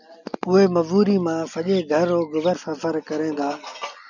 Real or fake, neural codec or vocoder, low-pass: real; none; 7.2 kHz